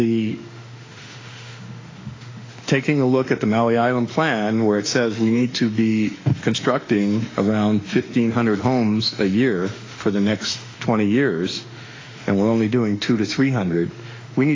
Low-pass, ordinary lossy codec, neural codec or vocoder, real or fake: 7.2 kHz; AAC, 32 kbps; autoencoder, 48 kHz, 32 numbers a frame, DAC-VAE, trained on Japanese speech; fake